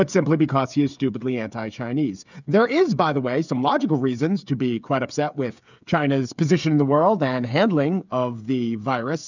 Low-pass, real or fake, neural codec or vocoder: 7.2 kHz; fake; codec, 16 kHz, 8 kbps, FreqCodec, smaller model